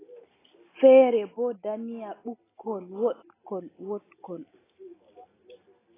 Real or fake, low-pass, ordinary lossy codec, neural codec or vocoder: real; 3.6 kHz; AAC, 16 kbps; none